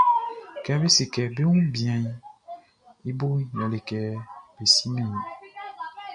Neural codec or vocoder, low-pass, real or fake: none; 9.9 kHz; real